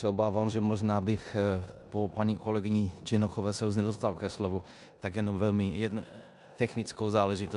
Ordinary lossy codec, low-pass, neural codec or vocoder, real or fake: AAC, 96 kbps; 10.8 kHz; codec, 16 kHz in and 24 kHz out, 0.9 kbps, LongCat-Audio-Codec, four codebook decoder; fake